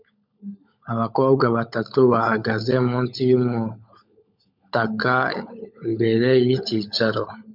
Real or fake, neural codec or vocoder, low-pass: fake; codec, 16 kHz, 16 kbps, FunCodec, trained on LibriTTS, 50 frames a second; 5.4 kHz